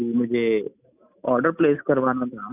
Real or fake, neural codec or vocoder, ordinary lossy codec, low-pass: real; none; none; 3.6 kHz